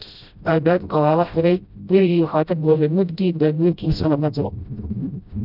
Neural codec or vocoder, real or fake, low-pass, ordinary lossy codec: codec, 16 kHz, 0.5 kbps, FreqCodec, smaller model; fake; 5.4 kHz; none